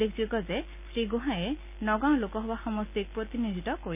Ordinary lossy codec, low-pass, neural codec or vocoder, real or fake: none; 3.6 kHz; none; real